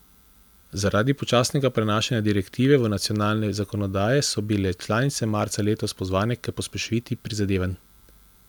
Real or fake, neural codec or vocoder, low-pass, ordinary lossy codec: fake; vocoder, 44.1 kHz, 128 mel bands every 256 samples, BigVGAN v2; none; none